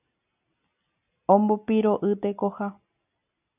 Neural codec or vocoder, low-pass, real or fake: none; 3.6 kHz; real